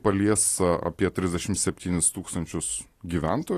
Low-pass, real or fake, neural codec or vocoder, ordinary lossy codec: 14.4 kHz; real; none; AAC, 48 kbps